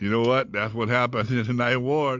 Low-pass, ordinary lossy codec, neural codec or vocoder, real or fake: 7.2 kHz; MP3, 64 kbps; none; real